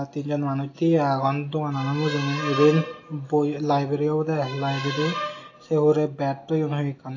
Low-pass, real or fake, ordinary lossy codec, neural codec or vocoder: 7.2 kHz; real; AAC, 32 kbps; none